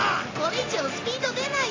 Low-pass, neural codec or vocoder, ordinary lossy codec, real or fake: 7.2 kHz; none; AAC, 32 kbps; real